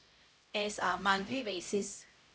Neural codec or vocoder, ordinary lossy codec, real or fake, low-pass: codec, 16 kHz, 0.5 kbps, X-Codec, HuBERT features, trained on LibriSpeech; none; fake; none